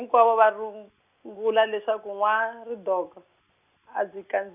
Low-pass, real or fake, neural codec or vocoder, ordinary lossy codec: 3.6 kHz; real; none; none